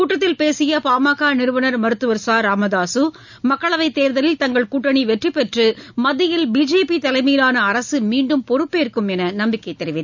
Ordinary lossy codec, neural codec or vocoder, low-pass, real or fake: none; none; 7.2 kHz; real